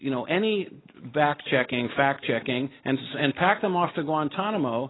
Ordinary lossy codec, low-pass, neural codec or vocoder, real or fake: AAC, 16 kbps; 7.2 kHz; none; real